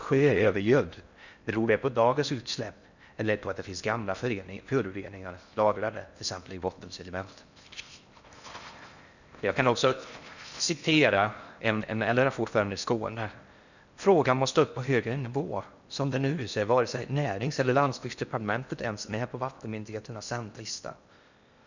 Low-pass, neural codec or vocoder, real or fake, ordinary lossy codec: 7.2 kHz; codec, 16 kHz in and 24 kHz out, 0.6 kbps, FocalCodec, streaming, 4096 codes; fake; none